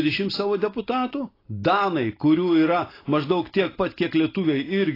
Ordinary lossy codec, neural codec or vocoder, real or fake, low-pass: AAC, 24 kbps; none; real; 5.4 kHz